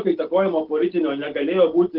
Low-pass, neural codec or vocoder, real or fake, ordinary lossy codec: 5.4 kHz; none; real; Opus, 16 kbps